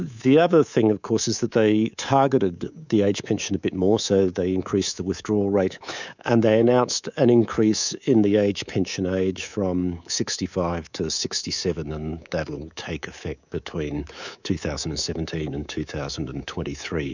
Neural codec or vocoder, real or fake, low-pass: codec, 24 kHz, 3.1 kbps, DualCodec; fake; 7.2 kHz